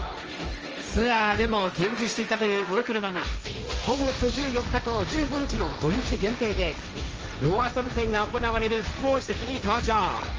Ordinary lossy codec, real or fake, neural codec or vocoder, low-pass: Opus, 24 kbps; fake; codec, 16 kHz, 1.1 kbps, Voila-Tokenizer; 7.2 kHz